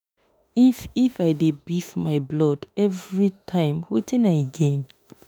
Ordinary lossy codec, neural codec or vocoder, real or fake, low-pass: none; autoencoder, 48 kHz, 32 numbers a frame, DAC-VAE, trained on Japanese speech; fake; none